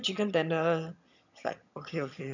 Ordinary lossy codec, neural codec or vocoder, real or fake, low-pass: none; vocoder, 22.05 kHz, 80 mel bands, HiFi-GAN; fake; 7.2 kHz